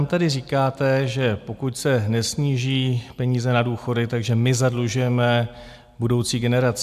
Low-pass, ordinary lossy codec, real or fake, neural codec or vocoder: 14.4 kHz; AAC, 96 kbps; real; none